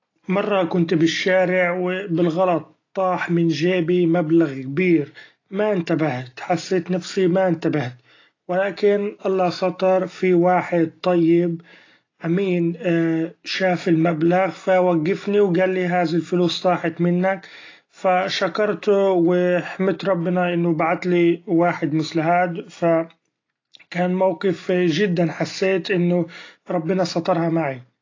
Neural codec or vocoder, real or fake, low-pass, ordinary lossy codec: none; real; 7.2 kHz; AAC, 32 kbps